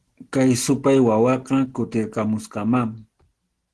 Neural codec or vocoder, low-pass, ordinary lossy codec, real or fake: none; 10.8 kHz; Opus, 16 kbps; real